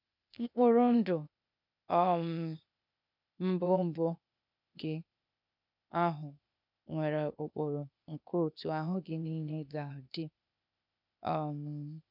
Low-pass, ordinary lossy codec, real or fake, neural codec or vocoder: 5.4 kHz; none; fake; codec, 16 kHz, 0.8 kbps, ZipCodec